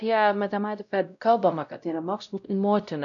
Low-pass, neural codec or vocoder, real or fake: 7.2 kHz; codec, 16 kHz, 0.5 kbps, X-Codec, WavLM features, trained on Multilingual LibriSpeech; fake